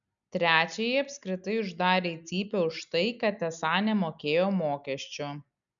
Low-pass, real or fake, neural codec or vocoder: 7.2 kHz; real; none